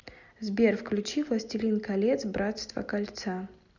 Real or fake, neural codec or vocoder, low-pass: real; none; 7.2 kHz